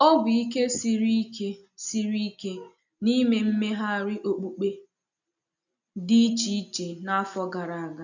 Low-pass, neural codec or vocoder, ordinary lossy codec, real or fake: 7.2 kHz; none; none; real